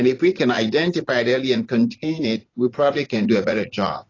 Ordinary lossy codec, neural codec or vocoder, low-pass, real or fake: AAC, 32 kbps; vocoder, 44.1 kHz, 80 mel bands, Vocos; 7.2 kHz; fake